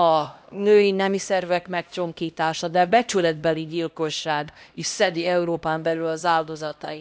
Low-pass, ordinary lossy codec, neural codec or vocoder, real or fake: none; none; codec, 16 kHz, 1 kbps, X-Codec, HuBERT features, trained on LibriSpeech; fake